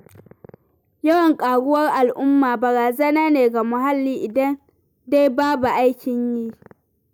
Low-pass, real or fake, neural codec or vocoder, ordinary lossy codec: none; real; none; none